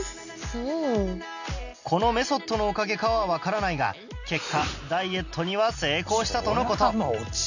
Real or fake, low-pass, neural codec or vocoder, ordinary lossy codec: real; 7.2 kHz; none; none